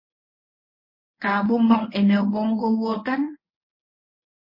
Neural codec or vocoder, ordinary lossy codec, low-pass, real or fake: codec, 24 kHz, 0.9 kbps, WavTokenizer, medium speech release version 1; MP3, 24 kbps; 5.4 kHz; fake